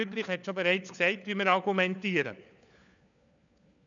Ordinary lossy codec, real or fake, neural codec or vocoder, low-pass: none; fake; codec, 16 kHz, 4 kbps, FunCodec, trained on LibriTTS, 50 frames a second; 7.2 kHz